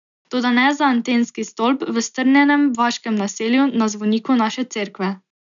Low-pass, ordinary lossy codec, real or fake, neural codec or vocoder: 7.2 kHz; none; real; none